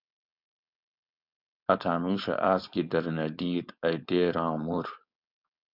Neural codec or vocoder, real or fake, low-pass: codec, 16 kHz, 4.8 kbps, FACodec; fake; 5.4 kHz